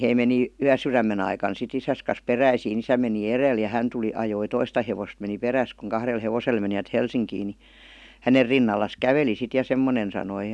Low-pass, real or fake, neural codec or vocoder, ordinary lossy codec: none; real; none; none